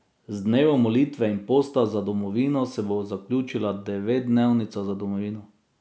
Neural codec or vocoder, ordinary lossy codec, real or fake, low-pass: none; none; real; none